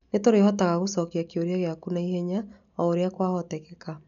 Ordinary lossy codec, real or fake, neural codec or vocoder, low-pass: none; real; none; 7.2 kHz